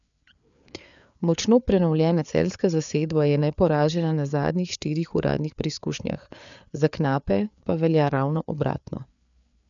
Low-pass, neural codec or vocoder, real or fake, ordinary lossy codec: 7.2 kHz; codec, 16 kHz, 4 kbps, FreqCodec, larger model; fake; none